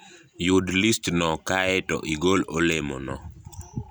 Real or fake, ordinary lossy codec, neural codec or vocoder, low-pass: real; none; none; none